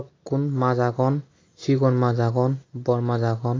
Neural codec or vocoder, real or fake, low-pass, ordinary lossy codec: none; real; 7.2 kHz; AAC, 32 kbps